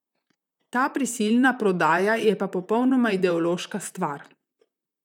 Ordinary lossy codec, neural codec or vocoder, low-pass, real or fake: none; vocoder, 44.1 kHz, 128 mel bands, Pupu-Vocoder; 19.8 kHz; fake